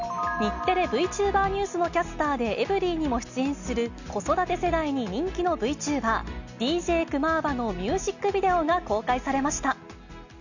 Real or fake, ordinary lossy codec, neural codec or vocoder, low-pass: real; none; none; 7.2 kHz